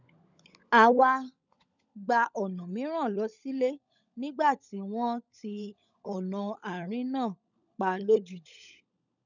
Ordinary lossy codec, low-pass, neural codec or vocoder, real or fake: none; 7.2 kHz; codec, 16 kHz, 16 kbps, FunCodec, trained on LibriTTS, 50 frames a second; fake